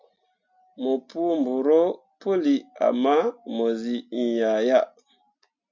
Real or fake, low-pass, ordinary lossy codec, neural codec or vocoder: real; 7.2 kHz; MP3, 64 kbps; none